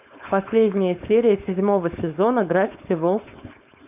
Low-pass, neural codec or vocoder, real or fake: 3.6 kHz; codec, 16 kHz, 4.8 kbps, FACodec; fake